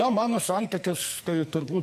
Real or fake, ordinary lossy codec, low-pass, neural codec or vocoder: fake; MP3, 64 kbps; 14.4 kHz; codec, 44.1 kHz, 2.6 kbps, SNAC